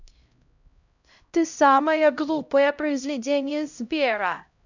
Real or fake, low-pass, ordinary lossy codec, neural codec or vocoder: fake; 7.2 kHz; none; codec, 16 kHz, 0.5 kbps, X-Codec, HuBERT features, trained on LibriSpeech